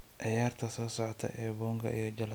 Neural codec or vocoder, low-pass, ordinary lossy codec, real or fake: none; none; none; real